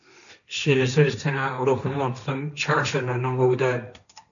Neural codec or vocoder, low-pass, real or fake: codec, 16 kHz, 1.1 kbps, Voila-Tokenizer; 7.2 kHz; fake